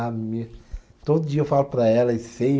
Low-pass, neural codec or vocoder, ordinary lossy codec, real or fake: none; none; none; real